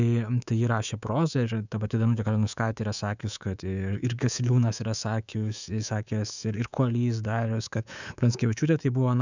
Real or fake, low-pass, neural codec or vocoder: fake; 7.2 kHz; codec, 24 kHz, 3.1 kbps, DualCodec